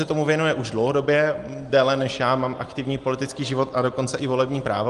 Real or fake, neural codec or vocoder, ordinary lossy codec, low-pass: real; none; Opus, 24 kbps; 10.8 kHz